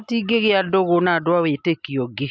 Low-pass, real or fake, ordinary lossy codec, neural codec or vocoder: none; real; none; none